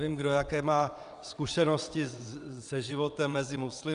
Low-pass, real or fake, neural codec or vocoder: 9.9 kHz; fake; vocoder, 22.05 kHz, 80 mel bands, WaveNeXt